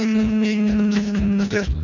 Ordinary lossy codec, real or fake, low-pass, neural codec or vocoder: none; fake; 7.2 kHz; codec, 24 kHz, 1.5 kbps, HILCodec